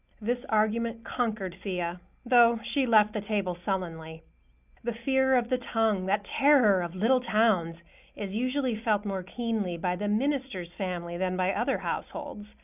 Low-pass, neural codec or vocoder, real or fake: 3.6 kHz; none; real